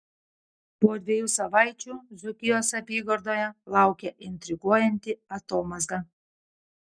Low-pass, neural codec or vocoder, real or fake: 9.9 kHz; none; real